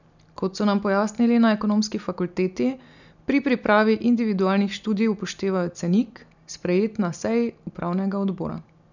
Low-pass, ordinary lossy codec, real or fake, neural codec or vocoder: 7.2 kHz; none; real; none